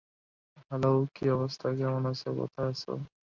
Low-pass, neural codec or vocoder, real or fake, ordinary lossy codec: 7.2 kHz; none; real; MP3, 64 kbps